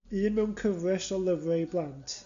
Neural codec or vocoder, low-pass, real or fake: none; 7.2 kHz; real